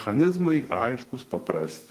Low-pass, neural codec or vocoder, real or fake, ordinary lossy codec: 14.4 kHz; codec, 32 kHz, 1.9 kbps, SNAC; fake; Opus, 24 kbps